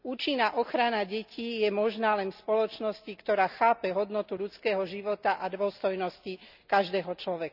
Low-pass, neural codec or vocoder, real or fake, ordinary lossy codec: 5.4 kHz; none; real; none